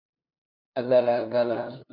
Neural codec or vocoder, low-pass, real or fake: codec, 16 kHz, 2 kbps, FunCodec, trained on LibriTTS, 25 frames a second; 5.4 kHz; fake